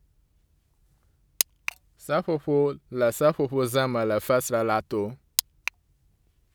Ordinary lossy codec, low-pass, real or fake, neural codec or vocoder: none; none; real; none